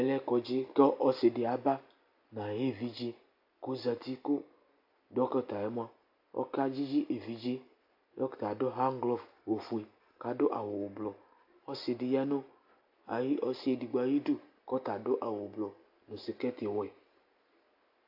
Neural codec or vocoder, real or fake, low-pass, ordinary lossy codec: none; real; 5.4 kHz; AAC, 48 kbps